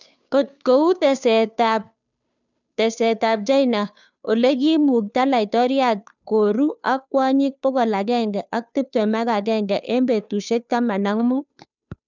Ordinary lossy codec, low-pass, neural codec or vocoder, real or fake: none; 7.2 kHz; codec, 16 kHz, 2 kbps, FunCodec, trained on Chinese and English, 25 frames a second; fake